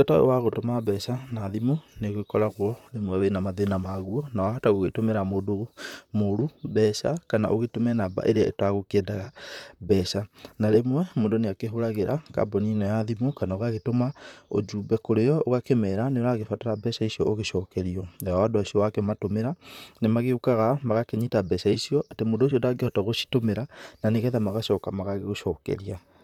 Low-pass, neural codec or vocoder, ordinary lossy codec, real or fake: 19.8 kHz; vocoder, 44.1 kHz, 128 mel bands, Pupu-Vocoder; none; fake